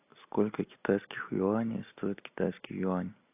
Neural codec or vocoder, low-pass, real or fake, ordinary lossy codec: none; 3.6 kHz; real; AAC, 32 kbps